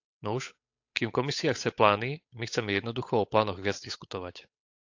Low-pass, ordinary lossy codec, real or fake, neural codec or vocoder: 7.2 kHz; AAC, 48 kbps; fake; codec, 16 kHz, 8 kbps, FunCodec, trained on Chinese and English, 25 frames a second